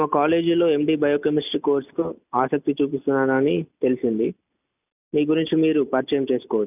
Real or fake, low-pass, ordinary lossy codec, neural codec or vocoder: real; 3.6 kHz; none; none